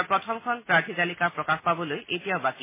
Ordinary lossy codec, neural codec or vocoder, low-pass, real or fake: MP3, 16 kbps; none; 3.6 kHz; real